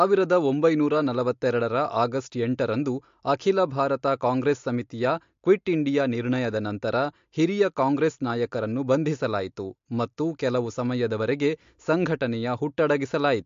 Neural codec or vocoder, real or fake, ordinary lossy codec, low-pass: none; real; MP3, 48 kbps; 7.2 kHz